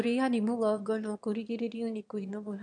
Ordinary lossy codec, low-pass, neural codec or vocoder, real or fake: none; 9.9 kHz; autoencoder, 22.05 kHz, a latent of 192 numbers a frame, VITS, trained on one speaker; fake